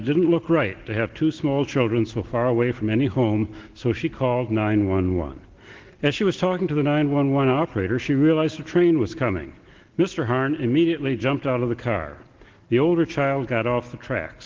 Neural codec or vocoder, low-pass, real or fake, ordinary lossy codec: none; 7.2 kHz; real; Opus, 16 kbps